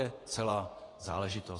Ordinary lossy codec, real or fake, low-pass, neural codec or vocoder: AAC, 32 kbps; fake; 10.8 kHz; vocoder, 24 kHz, 100 mel bands, Vocos